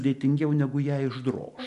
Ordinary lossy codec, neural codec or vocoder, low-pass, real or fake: MP3, 96 kbps; none; 10.8 kHz; real